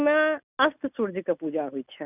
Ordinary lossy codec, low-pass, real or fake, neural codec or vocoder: none; 3.6 kHz; real; none